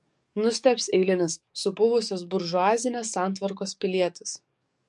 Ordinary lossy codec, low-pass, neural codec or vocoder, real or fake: MP3, 64 kbps; 10.8 kHz; codec, 44.1 kHz, 7.8 kbps, DAC; fake